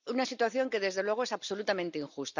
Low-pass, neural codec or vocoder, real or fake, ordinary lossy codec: 7.2 kHz; none; real; none